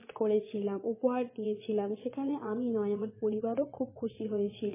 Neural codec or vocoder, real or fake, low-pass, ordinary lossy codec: vocoder, 22.05 kHz, 80 mel bands, Vocos; fake; 3.6 kHz; MP3, 16 kbps